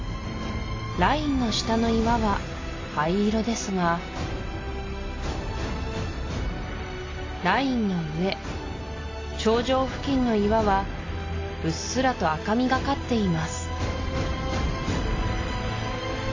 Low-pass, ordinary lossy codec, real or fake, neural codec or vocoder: 7.2 kHz; AAC, 32 kbps; real; none